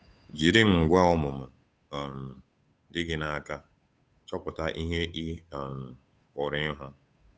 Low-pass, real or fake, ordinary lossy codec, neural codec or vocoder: none; fake; none; codec, 16 kHz, 8 kbps, FunCodec, trained on Chinese and English, 25 frames a second